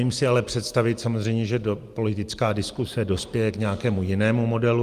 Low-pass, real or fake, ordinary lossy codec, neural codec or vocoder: 14.4 kHz; real; Opus, 32 kbps; none